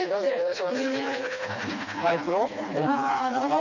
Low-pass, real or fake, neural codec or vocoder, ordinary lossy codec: 7.2 kHz; fake; codec, 16 kHz, 2 kbps, FreqCodec, smaller model; none